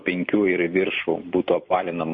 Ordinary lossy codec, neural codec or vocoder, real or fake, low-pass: MP3, 32 kbps; vocoder, 44.1 kHz, 128 mel bands every 256 samples, BigVGAN v2; fake; 9.9 kHz